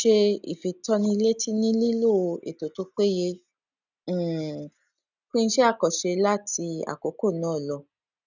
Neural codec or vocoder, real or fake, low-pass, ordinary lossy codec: none; real; 7.2 kHz; none